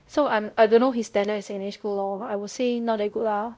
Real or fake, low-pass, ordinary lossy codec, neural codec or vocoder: fake; none; none; codec, 16 kHz, 0.5 kbps, X-Codec, WavLM features, trained on Multilingual LibriSpeech